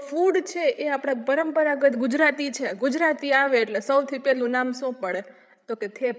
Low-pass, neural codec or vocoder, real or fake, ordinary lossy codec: none; codec, 16 kHz, 8 kbps, FreqCodec, larger model; fake; none